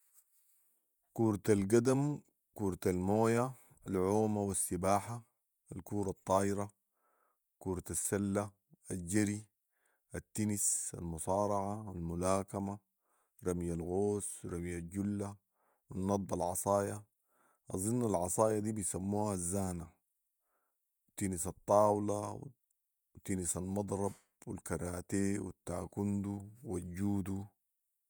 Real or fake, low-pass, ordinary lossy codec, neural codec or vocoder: fake; none; none; vocoder, 48 kHz, 128 mel bands, Vocos